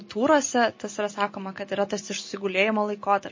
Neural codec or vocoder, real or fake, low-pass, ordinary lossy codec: none; real; 7.2 kHz; MP3, 32 kbps